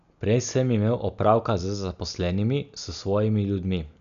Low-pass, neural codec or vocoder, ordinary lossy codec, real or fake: 7.2 kHz; none; none; real